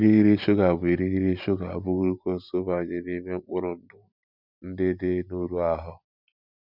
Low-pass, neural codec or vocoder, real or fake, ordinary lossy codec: 5.4 kHz; vocoder, 44.1 kHz, 128 mel bands every 512 samples, BigVGAN v2; fake; none